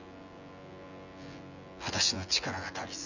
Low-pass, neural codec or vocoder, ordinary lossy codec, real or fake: 7.2 kHz; vocoder, 24 kHz, 100 mel bands, Vocos; none; fake